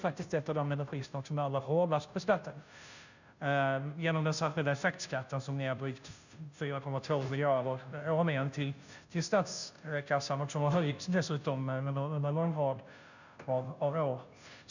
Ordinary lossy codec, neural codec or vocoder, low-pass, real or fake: none; codec, 16 kHz, 0.5 kbps, FunCodec, trained on Chinese and English, 25 frames a second; 7.2 kHz; fake